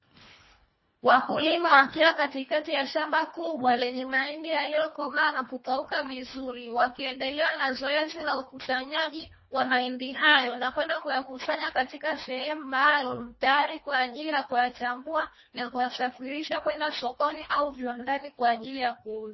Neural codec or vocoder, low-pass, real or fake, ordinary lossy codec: codec, 24 kHz, 1.5 kbps, HILCodec; 7.2 kHz; fake; MP3, 24 kbps